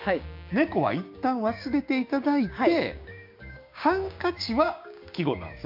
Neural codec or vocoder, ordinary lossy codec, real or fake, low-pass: codec, 16 kHz, 6 kbps, DAC; MP3, 48 kbps; fake; 5.4 kHz